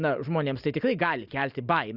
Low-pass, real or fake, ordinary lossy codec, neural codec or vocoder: 5.4 kHz; real; Opus, 64 kbps; none